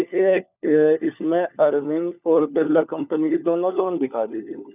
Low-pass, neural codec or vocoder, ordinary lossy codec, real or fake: 3.6 kHz; codec, 16 kHz, 4 kbps, FunCodec, trained on LibriTTS, 50 frames a second; none; fake